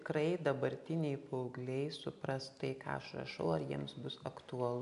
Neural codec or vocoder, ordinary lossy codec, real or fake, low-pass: none; AAC, 64 kbps; real; 10.8 kHz